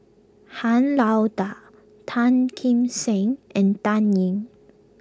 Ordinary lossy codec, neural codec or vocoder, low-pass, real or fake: none; none; none; real